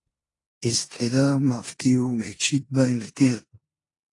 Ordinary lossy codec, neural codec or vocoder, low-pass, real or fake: AAC, 32 kbps; codec, 16 kHz in and 24 kHz out, 0.9 kbps, LongCat-Audio-Codec, four codebook decoder; 10.8 kHz; fake